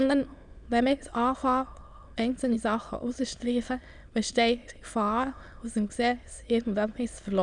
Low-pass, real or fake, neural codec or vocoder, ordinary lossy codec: 9.9 kHz; fake; autoencoder, 22.05 kHz, a latent of 192 numbers a frame, VITS, trained on many speakers; none